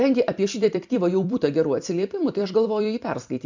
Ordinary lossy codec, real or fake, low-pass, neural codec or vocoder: MP3, 64 kbps; fake; 7.2 kHz; vocoder, 44.1 kHz, 128 mel bands every 256 samples, BigVGAN v2